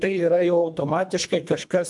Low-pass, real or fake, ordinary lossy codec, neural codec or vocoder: 10.8 kHz; fake; MP3, 96 kbps; codec, 24 kHz, 1.5 kbps, HILCodec